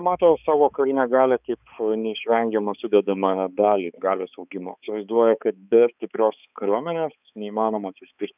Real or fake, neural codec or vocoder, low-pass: fake; codec, 16 kHz, 4 kbps, X-Codec, HuBERT features, trained on balanced general audio; 3.6 kHz